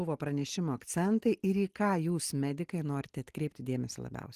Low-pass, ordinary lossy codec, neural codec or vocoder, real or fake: 14.4 kHz; Opus, 24 kbps; none; real